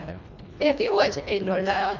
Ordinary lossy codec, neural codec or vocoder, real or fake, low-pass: none; codec, 24 kHz, 1.5 kbps, HILCodec; fake; 7.2 kHz